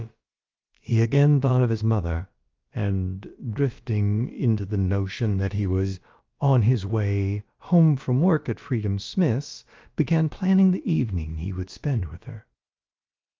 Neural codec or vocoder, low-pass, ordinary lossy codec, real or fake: codec, 16 kHz, about 1 kbps, DyCAST, with the encoder's durations; 7.2 kHz; Opus, 24 kbps; fake